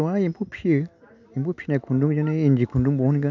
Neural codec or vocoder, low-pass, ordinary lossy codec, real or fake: none; 7.2 kHz; none; real